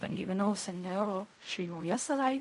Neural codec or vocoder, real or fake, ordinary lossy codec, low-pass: codec, 16 kHz in and 24 kHz out, 0.4 kbps, LongCat-Audio-Codec, fine tuned four codebook decoder; fake; MP3, 48 kbps; 10.8 kHz